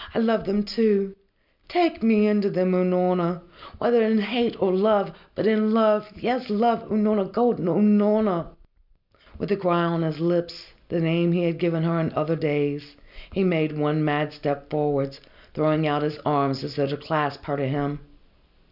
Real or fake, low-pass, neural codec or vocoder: real; 5.4 kHz; none